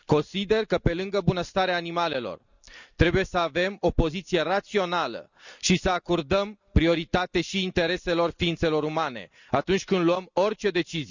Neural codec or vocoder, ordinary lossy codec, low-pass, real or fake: none; none; 7.2 kHz; real